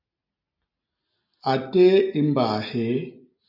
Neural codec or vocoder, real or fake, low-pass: none; real; 5.4 kHz